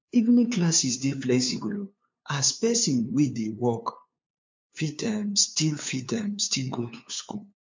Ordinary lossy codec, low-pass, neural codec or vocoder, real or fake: MP3, 48 kbps; 7.2 kHz; codec, 16 kHz, 2 kbps, FunCodec, trained on LibriTTS, 25 frames a second; fake